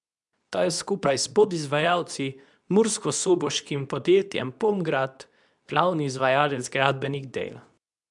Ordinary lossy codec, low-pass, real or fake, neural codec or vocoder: none; 10.8 kHz; fake; codec, 24 kHz, 0.9 kbps, WavTokenizer, medium speech release version 2